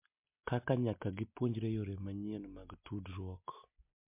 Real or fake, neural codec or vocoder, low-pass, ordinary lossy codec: real; none; 3.6 kHz; MP3, 32 kbps